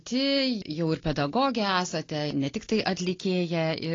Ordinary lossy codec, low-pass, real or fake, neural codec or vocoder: AAC, 32 kbps; 7.2 kHz; real; none